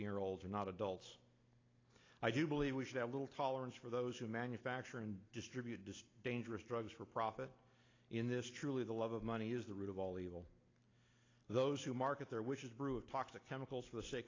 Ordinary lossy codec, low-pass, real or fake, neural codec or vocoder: AAC, 32 kbps; 7.2 kHz; real; none